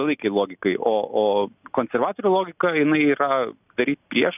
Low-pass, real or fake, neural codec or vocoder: 3.6 kHz; real; none